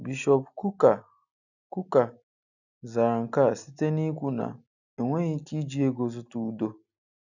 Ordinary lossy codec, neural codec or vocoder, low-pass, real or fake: none; none; 7.2 kHz; real